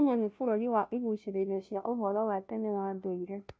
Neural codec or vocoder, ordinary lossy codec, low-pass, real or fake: codec, 16 kHz, 0.5 kbps, FunCodec, trained on Chinese and English, 25 frames a second; none; none; fake